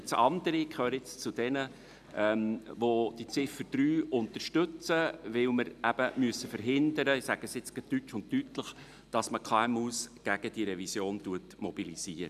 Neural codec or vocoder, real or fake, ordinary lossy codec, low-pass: none; real; none; 14.4 kHz